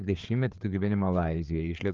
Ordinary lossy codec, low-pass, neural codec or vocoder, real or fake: Opus, 24 kbps; 7.2 kHz; codec, 16 kHz, 4 kbps, FreqCodec, larger model; fake